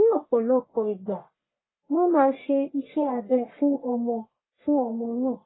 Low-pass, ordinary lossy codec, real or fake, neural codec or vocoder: 7.2 kHz; AAC, 16 kbps; fake; codec, 44.1 kHz, 1.7 kbps, Pupu-Codec